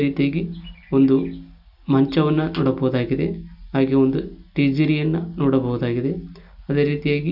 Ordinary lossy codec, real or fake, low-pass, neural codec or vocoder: none; real; 5.4 kHz; none